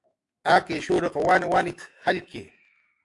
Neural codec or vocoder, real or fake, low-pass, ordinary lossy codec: autoencoder, 48 kHz, 128 numbers a frame, DAC-VAE, trained on Japanese speech; fake; 10.8 kHz; AAC, 64 kbps